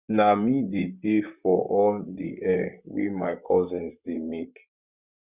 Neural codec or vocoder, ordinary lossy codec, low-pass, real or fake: vocoder, 44.1 kHz, 128 mel bands, Pupu-Vocoder; Opus, 64 kbps; 3.6 kHz; fake